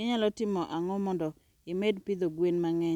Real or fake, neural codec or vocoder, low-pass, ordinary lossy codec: real; none; 19.8 kHz; none